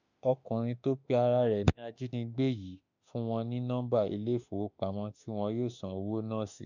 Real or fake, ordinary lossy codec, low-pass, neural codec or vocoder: fake; none; 7.2 kHz; autoencoder, 48 kHz, 32 numbers a frame, DAC-VAE, trained on Japanese speech